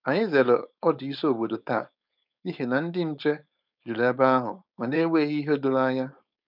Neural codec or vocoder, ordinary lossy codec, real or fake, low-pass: codec, 16 kHz, 4.8 kbps, FACodec; none; fake; 5.4 kHz